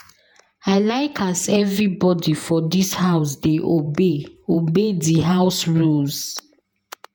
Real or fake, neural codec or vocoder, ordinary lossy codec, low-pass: fake; vocoder, 48 kHz, 128 mel bands, Vocos; none; none